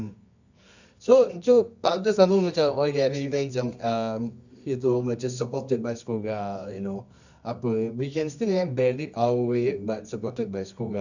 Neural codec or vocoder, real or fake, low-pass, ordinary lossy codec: codec, 24 kHz, 0.9 kbps, WavTokenizer, medium music audio release; fake; 7.2 kHz; none